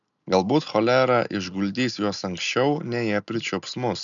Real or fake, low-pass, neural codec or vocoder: real; 7.2 kHz; none